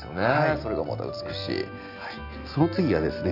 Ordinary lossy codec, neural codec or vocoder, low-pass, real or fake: none; none; 5.4 kHz; real